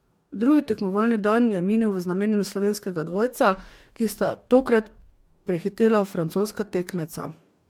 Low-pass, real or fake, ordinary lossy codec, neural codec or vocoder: 19.8 kHz; fake; MP3, 96 kbps; codec, 44.1 kHz, 2.6 kbps, DAC